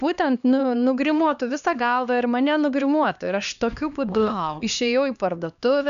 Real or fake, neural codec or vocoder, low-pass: fake; codec, 16 kHz, 2 kbps, X-Codec, HuBERT features, trained on LibriSpeech; 7.2 kHz